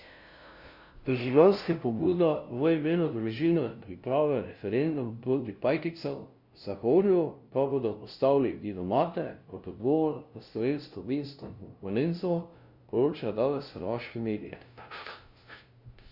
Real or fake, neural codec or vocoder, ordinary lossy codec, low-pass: fake; codec, 16 kHz, 0.5 kbps, FunCodec, trained on LibriTTS, 25 frames a second; Opus, 64 kbps; 5.4 kHz